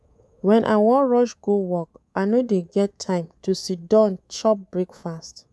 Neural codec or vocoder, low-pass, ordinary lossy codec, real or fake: codec, 24 kHz, 3.1 kbps, DualCodec; none; none; fake